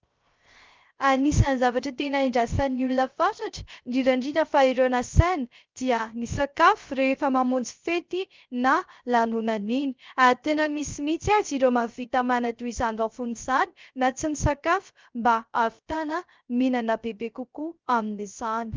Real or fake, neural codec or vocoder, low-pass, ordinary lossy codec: fake; codec, 16 kHz, 0.3 kbps, FocalCodec; 7.2 kHz; Opus, 24 kbps